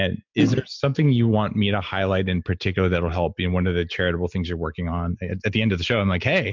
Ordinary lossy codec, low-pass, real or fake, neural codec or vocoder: MP3, 64 kbps; 7.2 kHz; real; none